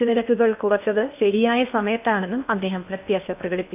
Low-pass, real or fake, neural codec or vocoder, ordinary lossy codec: 3.6 kHz; fake; codec, 16 kHz in and 24 kHz out, 0.8 kbps, FocalCodec, streaming, 65536 codes; none